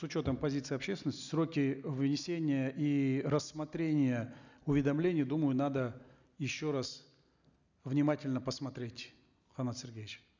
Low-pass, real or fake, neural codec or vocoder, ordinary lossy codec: 7.2 kHz; real; none; none